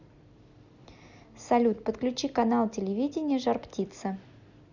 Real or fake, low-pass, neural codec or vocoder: real; 7.2 kHz; none